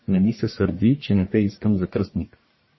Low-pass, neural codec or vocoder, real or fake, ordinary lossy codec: 7.2 kHz; codec, 44.1 kHz, 1.7 kbps, Pupu-Codec; fake; MP3, 24 kbps